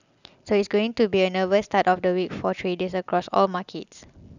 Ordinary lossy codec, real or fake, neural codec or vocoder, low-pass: none; real; none; 7.2 kHz